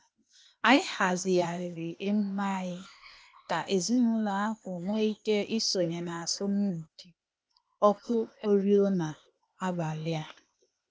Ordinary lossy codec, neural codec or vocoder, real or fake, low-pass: none; codec, 16 kHz, 0.8 kbps, ZipCodec; fake; none